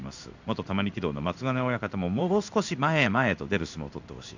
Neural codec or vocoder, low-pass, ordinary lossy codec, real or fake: codec, 16 kHz in and 24 kHz out, 1 kbps, XY-Tokenizer; 7.2 kHz; none; fake